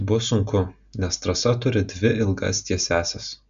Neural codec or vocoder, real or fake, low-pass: none; real; 7.2 kHz